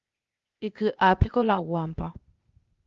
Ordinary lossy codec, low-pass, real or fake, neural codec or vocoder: Opus, 32 kbps; 7.2 kHz; fake; codec, 16 kHz, 0.8 kbps, ZipCodec